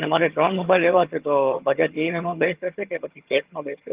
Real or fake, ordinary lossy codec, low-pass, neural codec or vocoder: fake; Opus, 32 kbps; 3.6 kHz; vocoder, 22.05 kHz, 80 mel bands, HiFi-GAN